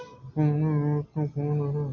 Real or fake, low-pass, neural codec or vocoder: real; 7.2 kHz; none